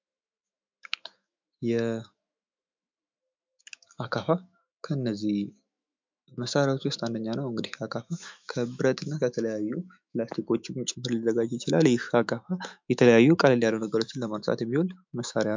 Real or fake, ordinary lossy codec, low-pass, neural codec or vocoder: fake; MP3, 64 kbps; 7.2 kHz; autoencoder, 48 kHz, 128 numbers a frame, DAC-VAE, trained on Japanese speech